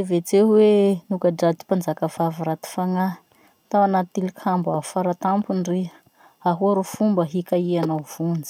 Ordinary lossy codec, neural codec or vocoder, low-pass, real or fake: none; none; 19.8 kHz; real